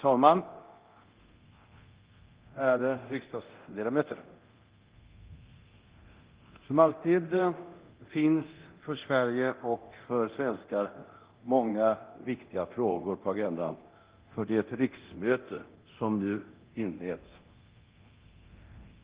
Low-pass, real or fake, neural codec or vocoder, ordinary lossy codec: 3.6 kHz; fake; codec, 24 kHz, 0.9 kbps, DualCodec; Opus, 16 kbps